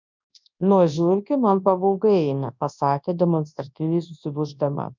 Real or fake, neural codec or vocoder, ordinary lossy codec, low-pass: fake; codec, 24 kHz, 0.9 kbps, WavTokenizer, large speech release; MP3, 64 kbps; 7.2 kHz